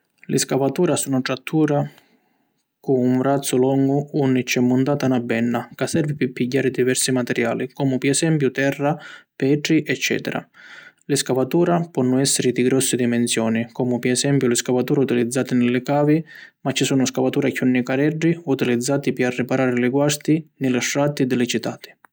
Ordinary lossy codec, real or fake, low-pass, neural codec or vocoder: none; real; none; none